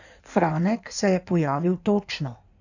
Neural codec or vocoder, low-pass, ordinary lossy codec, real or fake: codec, 16 kHz in and 24 kHz out, 1.1 kbps, FireRedTTS-2 codec; 7.2 kHz; none; fake